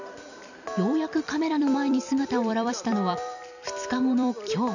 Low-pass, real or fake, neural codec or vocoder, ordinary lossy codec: 7.2 kHz; fake; vocoder, 44.1 kHz, 128 mel bands every 256 samples, BigVGAN v2; none